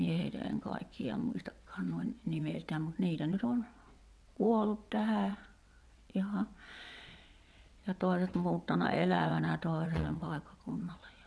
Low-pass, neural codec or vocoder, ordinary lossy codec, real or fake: none; vocoder, 22.05 kHz, 80 mel bands, WaveNeXt; none; fake